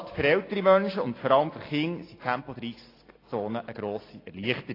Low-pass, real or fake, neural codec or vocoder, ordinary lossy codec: 5.4 kHz; real; none; AAC, 24 kbps